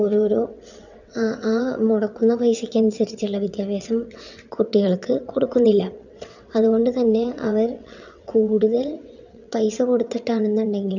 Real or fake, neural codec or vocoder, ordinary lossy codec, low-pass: fake; vocoder, 22.05 kHz, 80 mel bands, WaveNeXt; none; 7.2 kHz